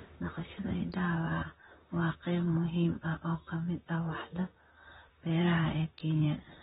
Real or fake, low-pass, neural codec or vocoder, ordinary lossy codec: fake; 19.8 kHz; vocoder, 44.1 kHz, 128 mel bands, Pupu-Vocoder; AAC, 16 kbps